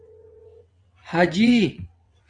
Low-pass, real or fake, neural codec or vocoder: 9.9 kHz; fake; vocoder, 22.05 kHz, 80 mel bands, WaveNeXt